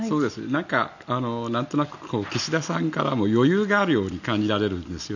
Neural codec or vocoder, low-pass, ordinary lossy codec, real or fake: none; 7.2 kHz; none; real